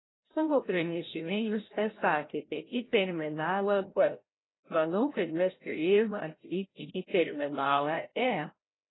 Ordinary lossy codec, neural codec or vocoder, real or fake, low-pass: AAC, 16 kbps; codec, 16 kHz, 0.5 kbps, FreqCodec, larger model; fake; 7.2 kHz